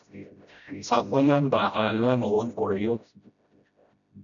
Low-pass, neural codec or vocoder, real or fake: 7.2 kHz; codec, 16 kHz, 0.5 kbps, FreqCodec, smaller model; fake